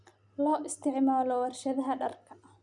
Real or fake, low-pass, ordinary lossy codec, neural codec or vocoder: real; 10.8 kHz; none; none